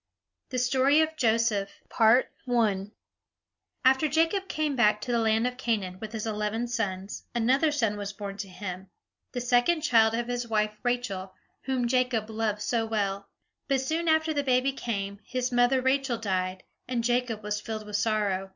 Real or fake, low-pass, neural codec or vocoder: real; 7.2 kHz; none